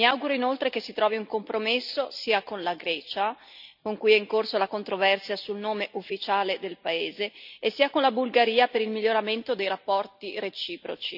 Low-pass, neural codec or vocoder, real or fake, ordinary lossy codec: 5.4 kHz; none; real; none